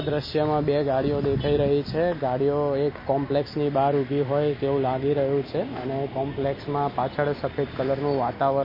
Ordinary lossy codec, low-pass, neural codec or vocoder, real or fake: MP3, 24 kbps; 5.4 kHz; none; real